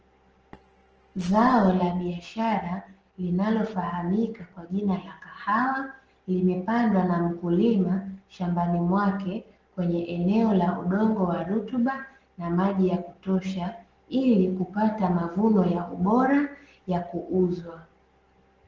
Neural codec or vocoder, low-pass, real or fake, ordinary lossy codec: none; 7.2 kHz; real; Opus, 16 kbps